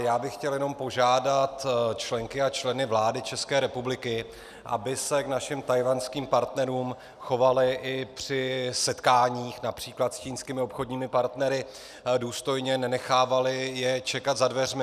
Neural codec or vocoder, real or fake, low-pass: none; real; 14.4 kHz